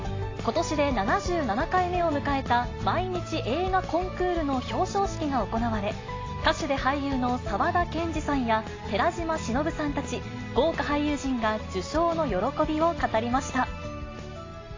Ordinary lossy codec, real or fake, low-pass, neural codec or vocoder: AAC, 32 kbps; real; 7.2 kHz; none